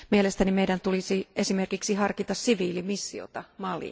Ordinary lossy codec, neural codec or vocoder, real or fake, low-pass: none; none; real; none